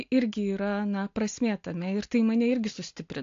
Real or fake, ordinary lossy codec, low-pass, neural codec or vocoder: real; AAC, 48 kbps; 7.2 kHz; none